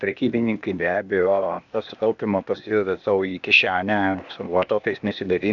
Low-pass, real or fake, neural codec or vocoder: 7.2 kHz; fake; codec, 16 kHz, 0.8 kbps, ZipCodec